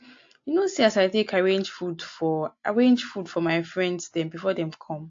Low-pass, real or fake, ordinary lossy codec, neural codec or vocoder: 7.2 kHz; real; AAC, 48 kbps; none